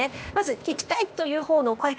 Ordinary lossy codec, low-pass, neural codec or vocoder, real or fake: none; none; codec, 16 kHz, 0.8 kbps, ZipCodec; fake